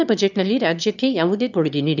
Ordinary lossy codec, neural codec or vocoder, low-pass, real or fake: none; autoencoder, 22.05 kHz, a latent of 192 numbers a frame, VITS, trained on one speaker; 7.2 kHz; fake